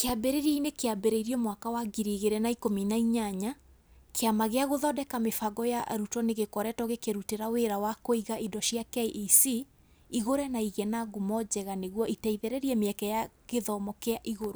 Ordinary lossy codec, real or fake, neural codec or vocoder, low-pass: none; real; none; none